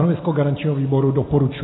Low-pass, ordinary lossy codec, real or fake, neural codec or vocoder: 7.2 kHz; AAC, 16 kbps; real; none